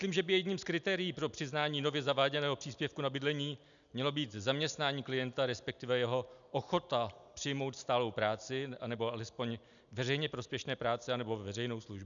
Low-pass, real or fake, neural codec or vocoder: 7.2 kHz; real; none